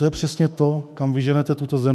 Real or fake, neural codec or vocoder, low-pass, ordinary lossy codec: fake; autoencoder, 48 kHz, 32 numbers a frame, DAC-VAE, trained on Japanese speech; 14.4 kHz; MP3, 96 kbps